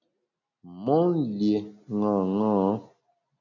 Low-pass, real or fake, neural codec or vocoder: 7.2 kHz; real; none